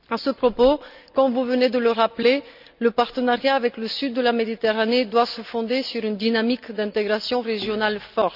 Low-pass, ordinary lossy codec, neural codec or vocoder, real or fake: 5.4 kHz; none; none; real